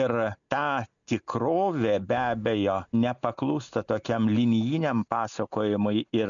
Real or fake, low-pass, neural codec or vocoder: real; 7.2 kHz; none